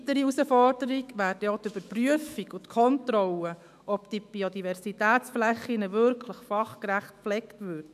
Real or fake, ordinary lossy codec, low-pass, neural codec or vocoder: fake; none; 14.4 kHz; autoencoder, 48 kHz, 128 numbers a frame, DAC-VAE, trained on Japanese speech